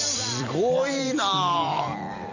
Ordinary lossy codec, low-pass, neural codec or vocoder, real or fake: none; 7.2 kHz; vocoder, 44.1 kHz, 128 mel bands every 256 samples, BigVGAN v2; fake